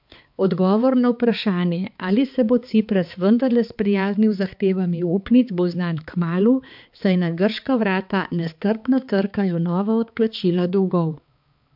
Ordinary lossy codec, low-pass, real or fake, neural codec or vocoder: MP3, 48 kbps; 5.4 kHz; fake; codec, 16 kHz, 4 kbps, X-Codec, HuBERT features, trained on balanced general audio